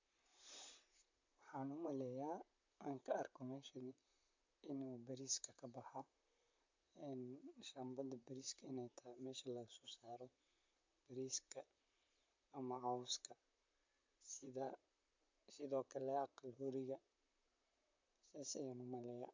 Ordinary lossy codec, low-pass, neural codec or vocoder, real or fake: MP3, 64 kbps; 7.2 kHz; vocoder, 44.1 kHz, 128 mel bands, Pupu-Vocoder; fake